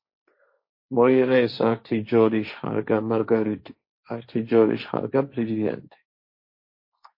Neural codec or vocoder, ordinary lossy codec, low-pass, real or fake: codec, 16 kHz, 1.1 kbps, Voila-Tokenizer; MP3, 32 kbps; 5.4 kHz; fake